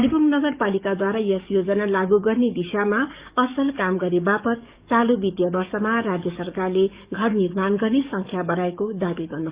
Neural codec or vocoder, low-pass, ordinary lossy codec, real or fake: codec, 44.1 kHz, 7.8 kbps, DAC; 3.6 kHz; Opus, 64 kbps; fake